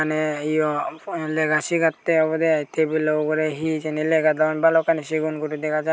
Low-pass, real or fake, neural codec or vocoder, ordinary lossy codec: none; real; none; none